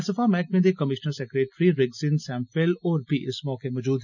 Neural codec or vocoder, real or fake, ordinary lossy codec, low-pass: none; real; none; 7.2 kHz